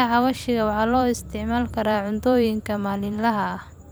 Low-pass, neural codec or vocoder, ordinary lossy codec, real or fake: none; vocoder, 44.1 kHz, 128 mel bands every 256 samples, BigVGAN v2; none; fake